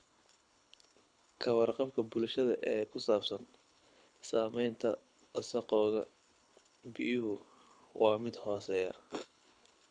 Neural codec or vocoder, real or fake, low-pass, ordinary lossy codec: codec, 24 kHz, 6 kbps, HILCodec; fake; 9.9 kHz; none